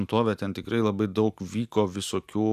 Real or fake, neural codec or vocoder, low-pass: real; none; 14.4 kHz